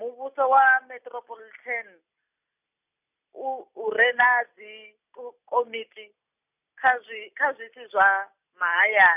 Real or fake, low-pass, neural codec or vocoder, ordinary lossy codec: real; 3.6 kHz; none; none